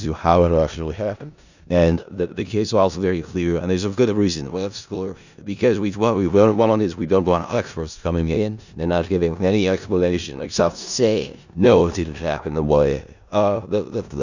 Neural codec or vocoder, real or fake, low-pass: codec, 16 kHz in and 24 kHz out, 0.4 kbps, LongCat-Audio-Codec, four codebook decoder; fake; 7.2 kHz